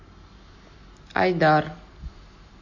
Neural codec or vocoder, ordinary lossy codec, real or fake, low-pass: none; MP3, 32 kbps; real; 7.2 kHz